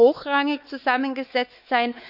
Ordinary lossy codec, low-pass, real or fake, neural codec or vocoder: none; 5.4 kHz; fake; codec, 16 kHz, 4 kbps, X-Codec, WavLM features, trained on Multilingual LibriSpeech